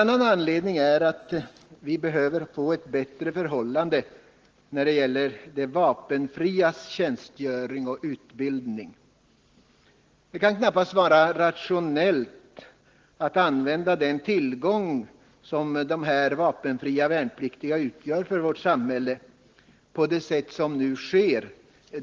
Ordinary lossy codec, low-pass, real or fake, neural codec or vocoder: Opus, 16 kbps; 7.2 kHz; real; none